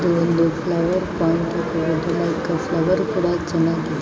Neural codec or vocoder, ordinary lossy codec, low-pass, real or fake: none; none; none; real